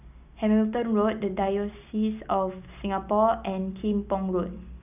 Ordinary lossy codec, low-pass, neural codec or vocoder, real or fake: none; 3.6 kHz; none; real